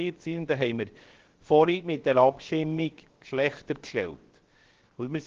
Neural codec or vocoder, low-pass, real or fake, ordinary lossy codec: codec, 16 kHz, 0.7 kbps, FocalCodec; 7.2 kHz; fake; Opus, 16 kbps